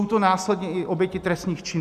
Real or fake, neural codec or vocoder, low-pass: real; none; 14.4 kHz